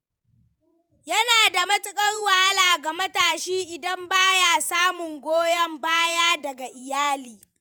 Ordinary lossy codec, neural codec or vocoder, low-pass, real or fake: none; vocoder, 48 kHz, 128 mel bands, Vocos; none; fake